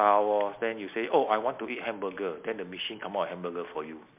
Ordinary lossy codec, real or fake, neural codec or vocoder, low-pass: MP3, 32 kbps; real; none; 3.6 kHz